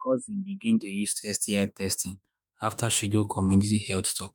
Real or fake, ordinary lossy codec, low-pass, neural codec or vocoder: fake; none; none; autoencoder, 48 kHz, 32 numbers a frame, DAC-VAE, trained on Japanese speech